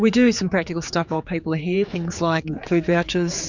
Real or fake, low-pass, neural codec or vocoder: fake; 7.2 kHz; codec, 16 kHz, 4 kbps, X-Codec, HuBERT features, trained on general audio